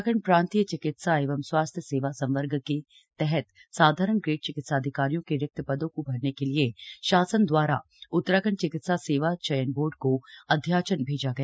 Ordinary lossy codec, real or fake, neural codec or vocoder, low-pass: none; real; none; none